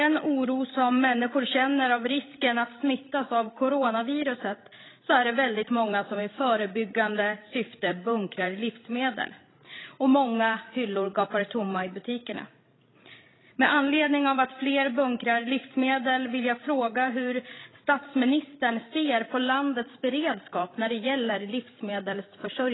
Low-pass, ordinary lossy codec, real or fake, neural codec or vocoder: 7.2 kHz; AAC, 16 kbps; fake; codec, 16 kHz, 16 kbps, FreqCodec, larger model